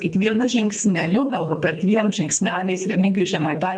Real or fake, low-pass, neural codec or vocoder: fake; 9.9 kHz; codec, 24 kHz, 1.5 kbps, HILCodec